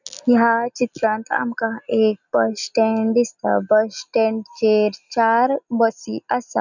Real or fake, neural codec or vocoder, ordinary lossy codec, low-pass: real; none; none; 7.2 kHz